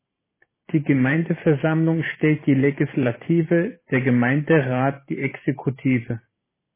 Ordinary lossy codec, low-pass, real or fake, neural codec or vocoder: MP3, 16 kbps; 3.6 kHz; fake; vocoder, 44.1 kHz, 128 mel bands every 512 samples, BigVGAN v2